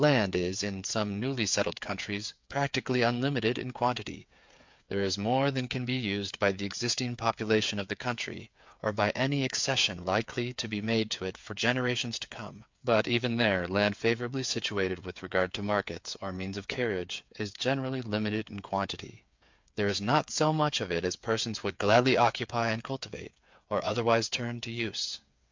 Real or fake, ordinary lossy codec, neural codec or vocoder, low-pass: fake; MP3, 64 kbps; codec, 16 kHz, 8 kbps, FreqCodec, smaller model; 7.2 kHz